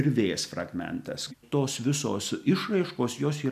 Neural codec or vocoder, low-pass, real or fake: none; 14.4 kHz; real